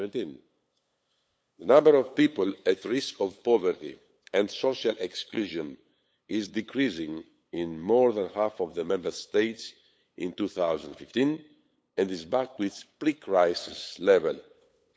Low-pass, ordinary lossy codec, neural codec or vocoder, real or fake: none; none; codec, 16 kHz, 8 kbps, FunCodec, trained on LibriTTS, 25 frames a second; fake